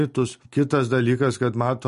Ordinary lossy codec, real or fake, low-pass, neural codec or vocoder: MP3, 48 kbps; real; 10.8 kHz; none